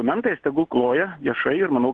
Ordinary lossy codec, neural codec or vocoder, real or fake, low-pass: Opus, 16 kbps; none; real; 9.9 kHz